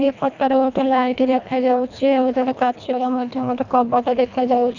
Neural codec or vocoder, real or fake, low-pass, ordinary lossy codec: codec, 24 kHz, 1.5 kbps, HILCodec; fake; 7.2 kHz; none